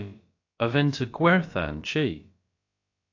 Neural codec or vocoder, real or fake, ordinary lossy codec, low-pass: codec, 16 kHz, about 1 kbps, DyCAST, with the encoder's durations; fake; AAC, 48 kbps; 7.2 kHz